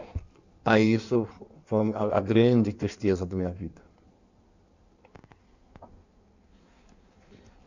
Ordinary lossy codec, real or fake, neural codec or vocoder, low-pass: none; fake; codec, 16 kHz in and 24 kHz out, 1.1 kbps, FireRedTTS-2 codec; 7.2 kHz